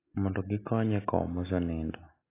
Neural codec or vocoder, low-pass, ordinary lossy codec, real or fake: none; 3.6 kHz; AAC, 24 kbps; real